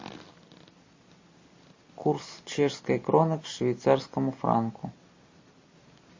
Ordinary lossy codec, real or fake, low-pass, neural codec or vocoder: MP3, 32 kbps; real; 7.2 kHz; none